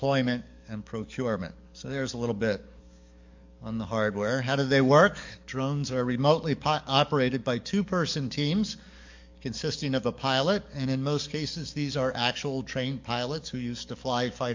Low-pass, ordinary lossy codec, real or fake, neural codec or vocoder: 7.2 kHz; MP3, 48 kbps; fake; codec, 44.1 kHz, 7.8 kbps, DAC